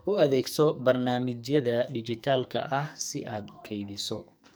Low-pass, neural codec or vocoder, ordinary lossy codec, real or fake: none; codec, 44.1 kHz, 2.6 kbps, SNAC; none; fake